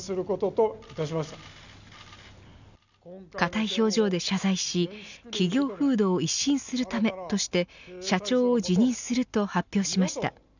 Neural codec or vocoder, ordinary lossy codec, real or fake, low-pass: none; none; real; 7.2 kHz